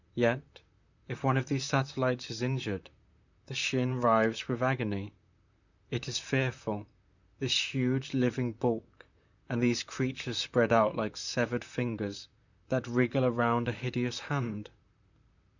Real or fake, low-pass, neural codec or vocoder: fake; 7.2 kHz; vocoder, 44.1 kHz, 128 mel bands, Pupu-Vocoder